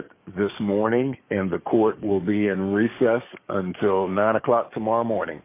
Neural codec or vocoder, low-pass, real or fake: codec, 44.1 kHz, 7.8 kbps, DAC; 3.6 kHz; fake